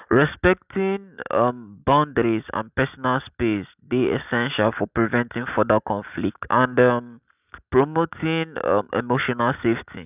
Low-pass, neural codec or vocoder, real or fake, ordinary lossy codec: 3.6 kHz; none; real; none